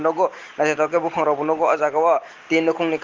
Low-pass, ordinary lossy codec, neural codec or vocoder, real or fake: 7.2 kHz; Opus, 16 kbps; none; real